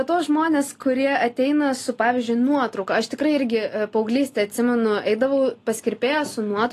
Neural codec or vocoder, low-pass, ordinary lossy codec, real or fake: none; 14.4 kHz; AAC, 48 kbps; real